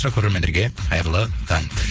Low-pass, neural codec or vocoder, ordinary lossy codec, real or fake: none; codec, 16 kHz, 4.8 kbps, FACodec; none; fake